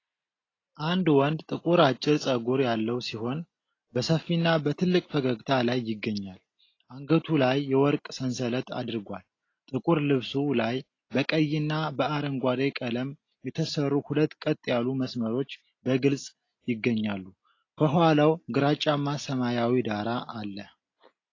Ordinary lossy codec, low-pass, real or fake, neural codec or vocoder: AAC, 32 kbps; 7.2 kHz; real; none